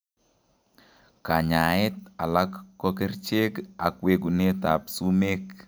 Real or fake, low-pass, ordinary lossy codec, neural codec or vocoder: real; none; none; none